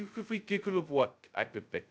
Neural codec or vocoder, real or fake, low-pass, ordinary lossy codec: codec, 16 kHz, 0.2 kbps, FocalCodec; fake; none; none